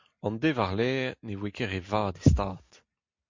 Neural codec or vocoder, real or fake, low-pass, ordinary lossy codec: none; real; 7.2 kHz; MP3, 48 kbps